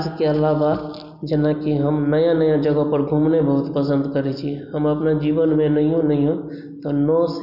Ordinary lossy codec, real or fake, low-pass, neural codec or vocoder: none; real; 5.4 kHz; none